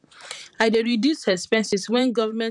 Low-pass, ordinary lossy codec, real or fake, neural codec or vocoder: 10.8 kHz; none; fake; vocoder, 44.1 kHz, 128 mel bands, Pupu-Vocoder